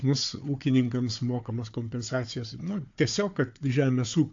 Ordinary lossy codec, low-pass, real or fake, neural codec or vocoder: AAC, 48 kbps; 7.2 kHz; fake; codec, 16 kHz, 4 kbps, FunCodec, trained on Chinese and English, 50 frames a second